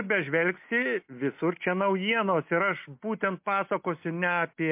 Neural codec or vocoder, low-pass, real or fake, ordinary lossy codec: none; 3.6 kHz; real; MP3, 32 kbps